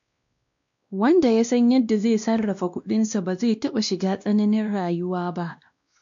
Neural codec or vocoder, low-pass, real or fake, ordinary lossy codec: codec, 16 kHz, 1 kbps, X-Codec, WavLM features, trained on Multilingual LibriSpeech; 7.2 kHz; fake; MP3, 64 kbps